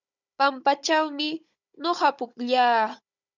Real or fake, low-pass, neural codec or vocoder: fake; 7.2 kHz; codec, 16 kHz, 16 kbps, FunCodec, trained on Chinese and English, 50 frames a second